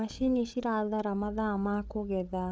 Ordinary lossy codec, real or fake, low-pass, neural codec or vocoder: none; fake; none; codec, 16 kHz, 8 kbps, FreqCodec, larger model